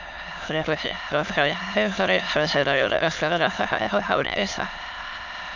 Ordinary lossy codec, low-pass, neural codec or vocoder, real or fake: none; 7.2 kHz; autoencoder, 22.05 kHz, a latent of 192 numbers a frame, VITS, trained on many speakers; fake